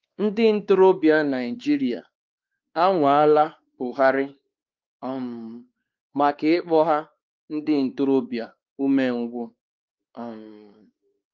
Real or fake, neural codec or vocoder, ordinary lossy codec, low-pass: fake; codec, 16 kHz, 2 kbps, X-Codec, WavLM features, trained on Multilingual LibriSpeech; Opus, 32 kbps; 7.2 kHz